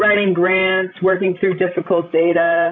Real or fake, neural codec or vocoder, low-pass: fake; codec, 16 kHz, 16 kbps, FreqCodec, larger model; 7.2 kHz